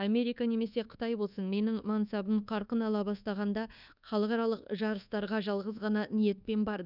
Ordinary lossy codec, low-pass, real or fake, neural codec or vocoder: none; 5.4 kHz; fake; codec, 24 kHz, 1.2 kbps, DualCodec